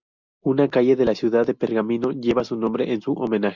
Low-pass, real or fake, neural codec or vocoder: 7.2 kHz; real; none